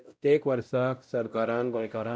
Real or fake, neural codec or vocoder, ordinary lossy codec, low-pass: fake; codec, 16 kHz, 0.5 kbps, X-Codec, WavLM features, trained on Multilingual LibriSpeech; none; none